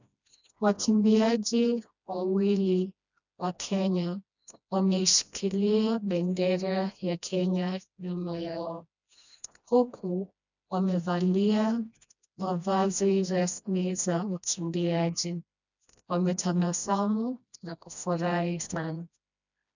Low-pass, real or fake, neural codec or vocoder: 7.2 kHz; fake; codec, 16 kHz, 1 kbps, FreqCodec, smaller model